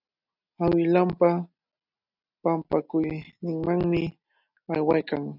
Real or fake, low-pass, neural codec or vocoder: real; 5.4 kHz; none